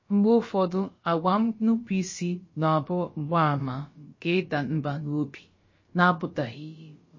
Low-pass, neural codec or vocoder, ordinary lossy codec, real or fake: 7.2 kHz; codec, 16 kHz, about 1 kbps, DyCAST, with the encoder's durations; MP3, 32 kbps; fake